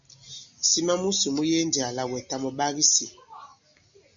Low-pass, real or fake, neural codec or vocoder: 7.2 kHz; real; none